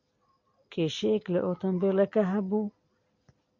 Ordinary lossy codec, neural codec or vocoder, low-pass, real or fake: MP3, 48 kbps; none; 7.2 kHz; real